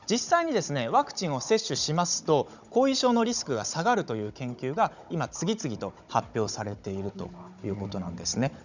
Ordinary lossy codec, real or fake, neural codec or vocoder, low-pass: none; fake; codec, 16 kHz, 16 kbps, FunCodec, trained on Chinese and English, 50 frames a second; 7.2 kHz